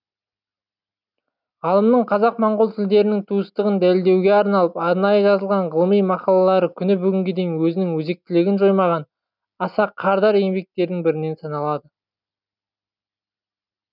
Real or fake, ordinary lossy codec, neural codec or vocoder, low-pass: real; none; none; 5.4 kHz